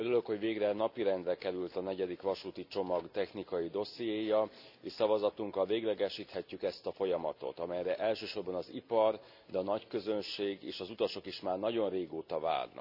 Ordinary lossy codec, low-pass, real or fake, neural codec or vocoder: none; 5.4 kHz; real; none